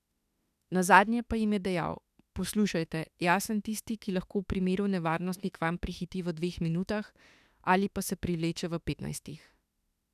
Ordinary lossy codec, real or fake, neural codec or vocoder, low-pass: none; fake; autoencoder, 48 kHz, 32 numbers a frame, DAC-VAE, trained on Japanese speech; 14.4 kHz